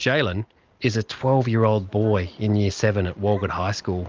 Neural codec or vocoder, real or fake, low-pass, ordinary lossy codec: none; real; 7.2 kHz; Opus, 24 kbps